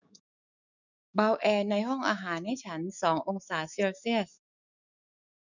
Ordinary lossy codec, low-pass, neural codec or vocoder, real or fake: none; 7.2 kHz; autoencoder, 48 kHz, 128 numbers a frame, DAC-VAE, trained on Japanese speech; fake